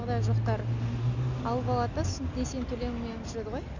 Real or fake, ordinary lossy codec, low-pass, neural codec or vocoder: real; none; 7.2 kHz; none